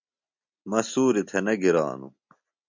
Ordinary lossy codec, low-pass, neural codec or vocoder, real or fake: MP3, 64 kbps; 7.2 kHz; none; real